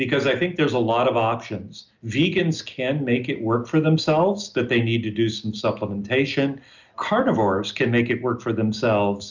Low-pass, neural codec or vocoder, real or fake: 7.2 kHz; none; real